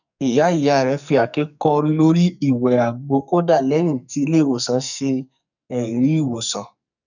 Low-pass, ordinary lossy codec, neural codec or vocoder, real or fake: 7.2 kHz; none; codec, 44.1 kHz, 2.6 kbps, SNAC; fake